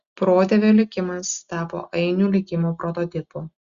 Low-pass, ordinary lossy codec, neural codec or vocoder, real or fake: 7.2 kHz; Opus, 64 kbps; none; real